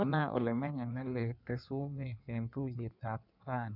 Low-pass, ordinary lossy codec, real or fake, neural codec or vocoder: 5.4 kHz; none; fake; codec, 16 kHz in and 24 kHz out, 1.1 kbps, FireRedTTS-2 codec